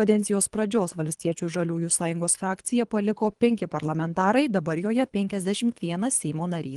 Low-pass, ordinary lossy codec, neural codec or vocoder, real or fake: 10.8 kHz; Opus, 24 kbps; codec, 24 kHz, 3 kbps, HILCodec; fake